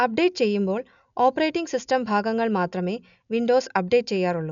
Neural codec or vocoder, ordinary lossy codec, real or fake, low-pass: none; none; real; 7.2 kHz